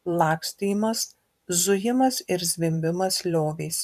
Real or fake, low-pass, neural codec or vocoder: fake; 14.4 kHz; vocoder, 44.1 kHz, 128 mel bands every 512 samples, BigVGAN v2